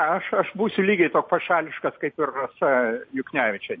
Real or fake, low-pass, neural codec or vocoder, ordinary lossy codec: real; 7.2 kHz; none; MP3, 32 kbps